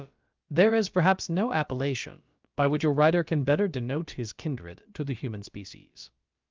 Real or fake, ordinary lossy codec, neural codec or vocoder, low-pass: fake; Opus, 24 kbps; codec, 16 kHz, about 1 kbps, DyCAST, with the encoder's durations; 7.2 kHz